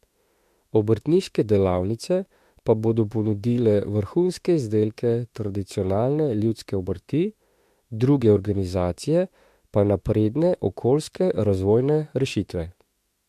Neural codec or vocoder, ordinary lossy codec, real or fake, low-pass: autoencoder, 48 kHz, 32 numbers a frame, DAC-VAE, trained on Japanese speech; MP3, 64 kbps; fake; 14.4 kHz